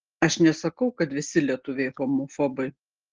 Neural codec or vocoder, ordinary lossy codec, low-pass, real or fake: none; Opus, 16 kbps; 7.2 kHz; real